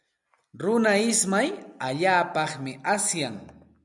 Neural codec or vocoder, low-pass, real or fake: none; 10.8 kHz; real